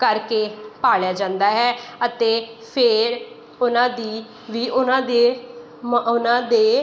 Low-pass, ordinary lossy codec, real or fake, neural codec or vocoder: none; none; real; none